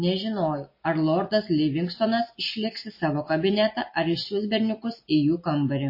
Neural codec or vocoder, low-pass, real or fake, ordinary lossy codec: none; 5.4 kHz; real; MP3, 24 kbps